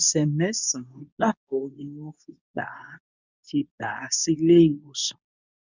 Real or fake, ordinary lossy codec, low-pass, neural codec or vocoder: fake; none; 7.2 kHz; codec, 24 kHz, 0.9 kbps, WavTokenizer, medium speech release version 2